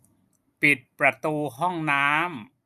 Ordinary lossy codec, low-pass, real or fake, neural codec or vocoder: none; 14.4 kHz; real; none